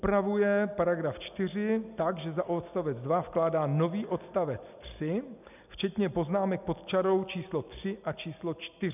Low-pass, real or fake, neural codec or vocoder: 3.6 kHz; real; none